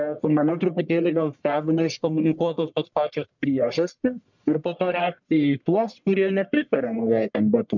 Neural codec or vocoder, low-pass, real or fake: codec, 44.1 kHz, 1.7 kbps, Pupu-Codec; 7.2 kHz; fake